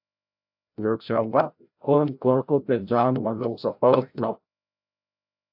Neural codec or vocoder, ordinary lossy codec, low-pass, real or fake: codec, 16 kHz, 0.5 kbps, FreqCodec, larger model; AAC, 48 kbps; 5.4 kHz; fake